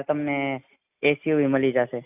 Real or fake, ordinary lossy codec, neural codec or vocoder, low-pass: real; none; none; 3.6 kHz